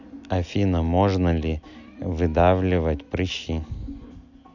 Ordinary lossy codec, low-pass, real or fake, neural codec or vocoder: none; 7.2 kHz; real; none